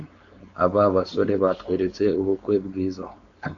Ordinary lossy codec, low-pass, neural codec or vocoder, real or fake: AAC, 48 kbps; 7.2 kHz; codec, 16 kHz, 4.8 kbps, FACodec; fake